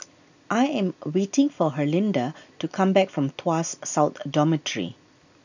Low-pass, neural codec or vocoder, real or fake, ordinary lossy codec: 7.2 kHz; none; real; none